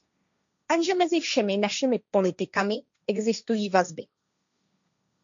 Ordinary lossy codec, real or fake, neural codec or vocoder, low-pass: MP3, 64 kbps; fake; codec, 16 kHz, 1.1 kbps, Voila-Tokenizer; 7.2 kHz